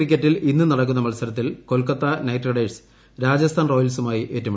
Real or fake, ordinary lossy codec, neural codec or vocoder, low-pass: real; none; none; none